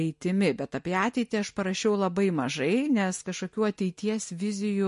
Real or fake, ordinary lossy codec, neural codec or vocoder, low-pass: real; MP3, 48 kbps; none; 10.8 kHz